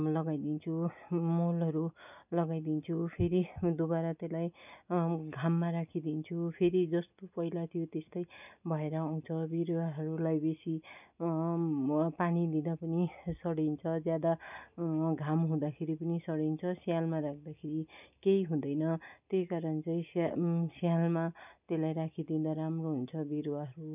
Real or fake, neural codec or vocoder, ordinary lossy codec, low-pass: real; none; none; 3.6 kHz